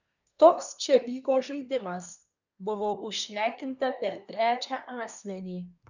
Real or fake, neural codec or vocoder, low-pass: fake; codec, 24 kHz, 1 kbps, SNAC; 7.2 kHz